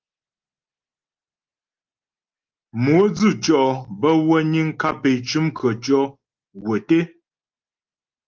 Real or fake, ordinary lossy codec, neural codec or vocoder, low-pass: real; Opus, 24 kbps; none; 7.2 kHz